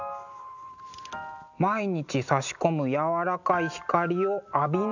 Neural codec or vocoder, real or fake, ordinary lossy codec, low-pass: none; real; none; 7.2 kHz